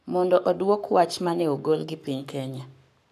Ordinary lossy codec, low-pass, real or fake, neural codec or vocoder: none; 14.4 kHz; fake; codec, 44.1 kHz, 7.8 kbps, Pupu-Codec